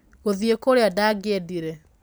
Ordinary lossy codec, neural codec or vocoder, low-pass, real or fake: none; none; none; real